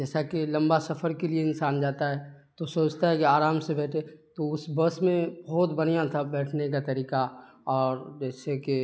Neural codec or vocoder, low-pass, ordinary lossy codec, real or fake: none; none; none; real